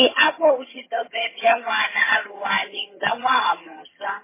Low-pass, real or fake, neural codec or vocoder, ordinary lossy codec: 3.6 kHz; fake; vocoder, 22.05 kHz, 80 mel bands, HiFi-GAN; MP3, 16 kbps